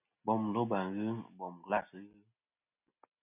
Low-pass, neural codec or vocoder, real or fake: 3.6 kHz; none; real